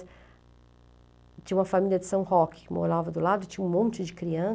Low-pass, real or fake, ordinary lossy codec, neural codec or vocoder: none; real; none; none